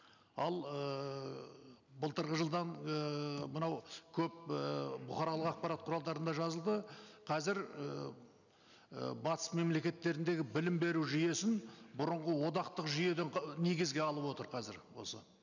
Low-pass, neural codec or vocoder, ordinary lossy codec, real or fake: 7.2 kHz; none; none; real